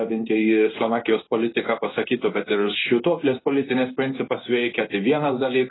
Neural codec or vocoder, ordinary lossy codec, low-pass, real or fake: codec, 16 kHz in and 24 kHz out, 1 kbps, XY-Tokenizer; AAC, 16 kbps; 7.2 kHz; fake